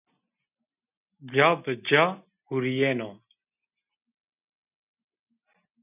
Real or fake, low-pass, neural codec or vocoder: real; 3.6 kHz; none